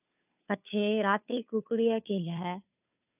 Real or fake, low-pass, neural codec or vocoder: fake; 3.6 kHz; codec, 16 kHz, 2 kbps, FunCodec, trained on Chinese and English, 25 frames a second